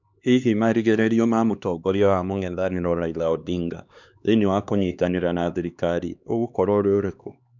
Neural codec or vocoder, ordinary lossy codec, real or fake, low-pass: codec, 16 kHz, 2 kbps, X-Codec, HuBERT features, trained on LibriSpeech; none; fake; 7.2 kHz